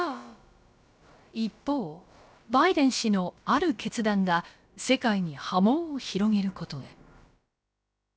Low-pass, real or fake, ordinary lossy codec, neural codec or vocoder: none; fake; none; codec, 16 kHz, about 1 kbps, DyCAST, with the encoder's durations